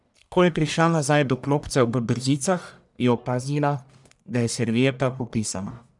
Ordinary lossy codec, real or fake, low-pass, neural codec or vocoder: none; fake; 10.8 kHz; codec, 44.1 kHz, 1.7 kbps, Pupu-Codec